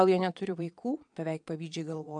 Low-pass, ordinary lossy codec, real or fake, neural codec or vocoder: 9.9 kHz; AAC, 64 kbps; fake; vocoder, 22.05 kHz, 80 mel bands, Vocos